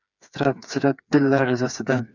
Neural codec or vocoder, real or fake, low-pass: codec, 16 kHz, 4 kbps, FreqCodec, smaller model; fake; 7.2 kHz